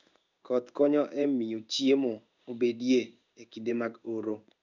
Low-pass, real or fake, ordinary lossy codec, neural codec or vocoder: 7.2 kHz; fake; none; codec, 16 kHz in and 24 kHz out, 1 kbps, XY-Tokenizer